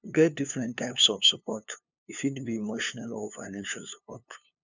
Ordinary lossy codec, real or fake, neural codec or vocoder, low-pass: none; fake; codec, 16 kHz, 2 kbps, FunCodec, trained on LibriTTS, 25 frames a second; 7.2 kHz